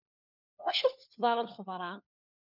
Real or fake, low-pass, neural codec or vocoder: fake; 5.4 kHz; codec, 16 kHz, 4 kbps, FunCodec, trained on LibriTTS, 50 frames a second